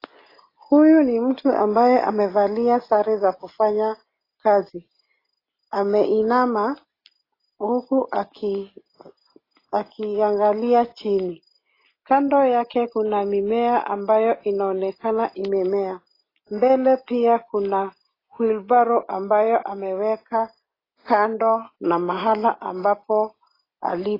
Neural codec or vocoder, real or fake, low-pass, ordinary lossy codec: none; real; 5.4 kHz; AAC, 24 kbps